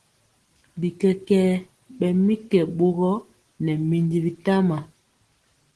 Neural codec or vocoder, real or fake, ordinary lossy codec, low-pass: none; real; Opus, 16 kbps; 10.8 kHz